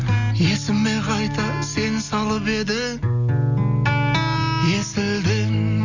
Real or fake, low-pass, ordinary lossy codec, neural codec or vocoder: real; 7.2 kHz; none; none